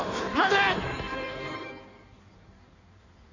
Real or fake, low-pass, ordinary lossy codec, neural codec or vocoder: fake; 7.2 kHz; none; codec, 16 kHz in and 24 kHz out, 1.1 kbps, FireRedTTS-2 codec